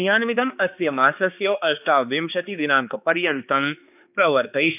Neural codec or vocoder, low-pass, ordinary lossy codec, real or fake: codec, 16 kHz, 2 kbps, X-Codec, HuBERT features, trained on balanced general audio; 3.6 kHz; none; fake